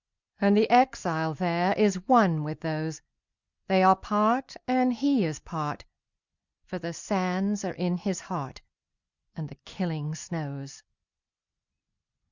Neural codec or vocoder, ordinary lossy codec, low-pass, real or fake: none; Opus, 64 kbps; 7.2 kHz; real